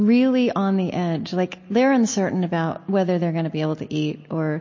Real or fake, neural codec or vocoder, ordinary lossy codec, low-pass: real; none; MP3, 32 kbps; 7.2 kHz